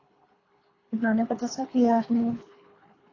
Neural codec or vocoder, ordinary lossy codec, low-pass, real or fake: codec, 24 kHz, 3 kbps, HILCodec; AAC, 32 kbps; 7.2 kHz; fake